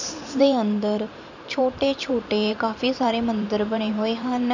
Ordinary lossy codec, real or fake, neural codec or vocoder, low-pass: none; fake; vocoder, 44.1 kHz, 128 mel bands every 512 samples, BigVGAN v2; 7.2 kHz